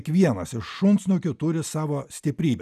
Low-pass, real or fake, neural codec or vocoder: 14.4 kHz; real; none